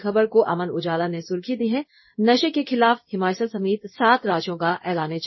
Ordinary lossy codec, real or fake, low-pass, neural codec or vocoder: MP3, 24 kbps; fake; 7.2 kHz; codec, 16 kHz in and 24 kHz out, 1 kbps, XY-Tokenizer